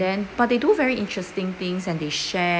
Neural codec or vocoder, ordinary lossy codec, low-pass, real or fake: none; none; none; real